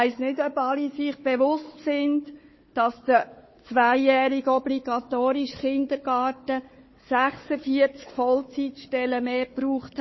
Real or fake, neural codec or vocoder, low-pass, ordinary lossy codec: fake; codec, 16 kHz, 4 kbps, FunCodec, trained on Chinese and English, 50 frames a second; 7.2 kHz; MP3, 24 kbps